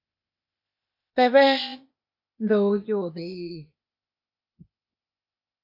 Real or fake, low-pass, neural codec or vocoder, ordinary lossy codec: fake; 5.4 kHz; codec, 16 kHz, 0.8 kbps, ZipCodec; MP3, 32 kbps